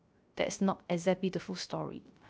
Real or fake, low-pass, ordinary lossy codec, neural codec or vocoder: fake; none; none; codec, 16 kHz, 0.3 kbps, FocalCodec